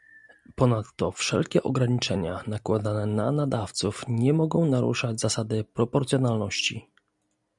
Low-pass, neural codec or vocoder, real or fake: 10.8 kHz; none; real